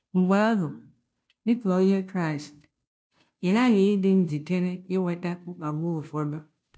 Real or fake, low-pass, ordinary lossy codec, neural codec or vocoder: fake; none; none; codec, 16 kHz, 0.5 kbps, FunCodec, trained on Chinese and English, 25 frames a second